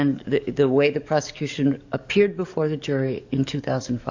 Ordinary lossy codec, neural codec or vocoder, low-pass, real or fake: AAC, 48 kbps; codec, 44.1 kHz, 7.8 kbps, DAC; 7.2 kHz; fake